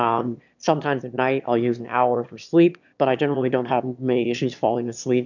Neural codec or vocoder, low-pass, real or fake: autoencoder, 22.05 kHz, a latent of 192 numbers a frame, VITS, trained on one speaker; 7.2 kHz; fake